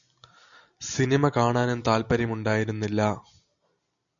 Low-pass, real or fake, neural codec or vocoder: 7.2 kHz; real; none